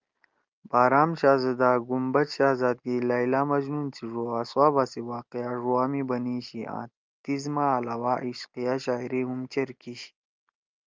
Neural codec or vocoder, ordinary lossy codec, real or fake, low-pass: none; Opus, 24 kbps; real; 7.2 kHz